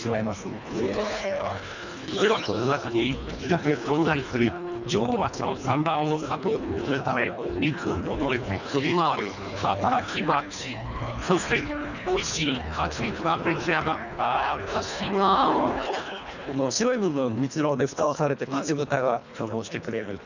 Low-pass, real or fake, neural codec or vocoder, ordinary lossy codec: 7.2 kHz; fake; codec, 24 kHz, 1.5 kbps, HILCodec; none